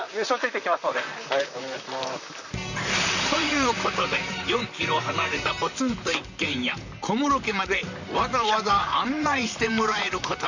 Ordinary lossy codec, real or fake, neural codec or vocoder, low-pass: none; fake; vocoder, 44.1 kHz, 128 mel bands, Pupu-Vocoder; 7.2 kHz